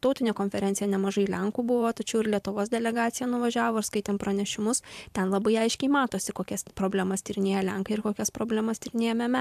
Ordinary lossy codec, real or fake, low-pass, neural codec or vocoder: AAC, 96 kbps; fake; 14.4 kHz; vocoder, 44.1 kHz, 128 mel bands every 512 samples, BigVGAN v2